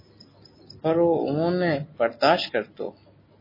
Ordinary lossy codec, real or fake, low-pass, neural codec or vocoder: MP3, 24 kbps; real; 5.4 kHz; none